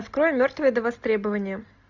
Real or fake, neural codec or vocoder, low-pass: real; none; 7.2 kHz